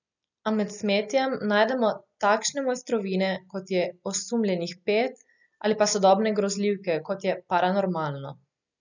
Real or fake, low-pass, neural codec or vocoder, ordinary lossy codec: real; 7.2 kHz; none; none